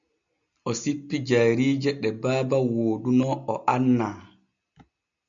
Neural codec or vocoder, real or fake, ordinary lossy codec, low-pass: none; real; MP3, 64 kbps; 7.2 kHz